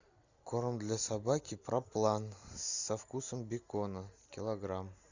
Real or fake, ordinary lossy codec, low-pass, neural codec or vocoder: real; Opus, 64 kbps; 7.2 kHz; none